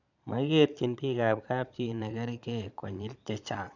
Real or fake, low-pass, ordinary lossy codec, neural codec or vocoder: fake; 7.2 kHz; none; vocoder, 22.05 kHz, 80 mel bands, Vocos